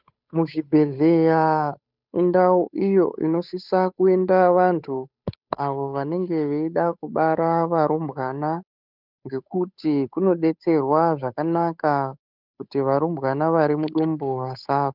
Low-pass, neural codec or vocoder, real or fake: 5.4 kHz; codec, 16 kHz, 8 kbps, FunCodec, trained on Chinese and English, 25 frames a second; fake